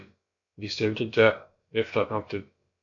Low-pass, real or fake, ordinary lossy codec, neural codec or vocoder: 7.2 kHz; fake; MP3, 48 kbps; codec, 16 kHz, about 1 kbps, DyCAST, with the encoder's durations